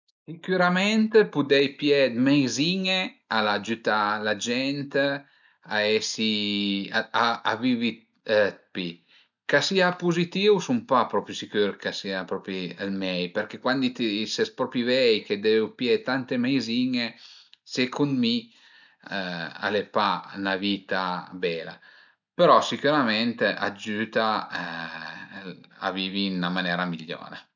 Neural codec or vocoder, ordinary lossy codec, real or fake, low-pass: none; none; real; 7.2 kHz